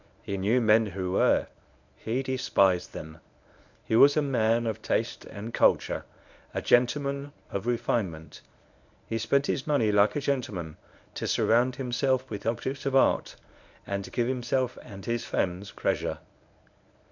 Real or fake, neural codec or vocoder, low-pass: fake; codec, 24 kHz, 0.9 kbps, WavTokenizer, medium speech release version 1; 7.2 kHz